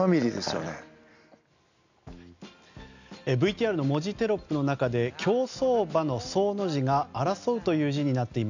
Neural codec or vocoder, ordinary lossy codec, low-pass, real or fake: none; none; 7.2 kHz; real